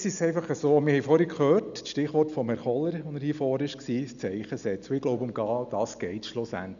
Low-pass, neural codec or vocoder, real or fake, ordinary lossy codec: 7.2 kHz; none; real; none